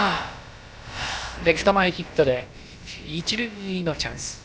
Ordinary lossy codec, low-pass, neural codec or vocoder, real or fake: none; none; codec, 16 kHz, about 1 kbps, DyCAST, with the encoder's durations; fake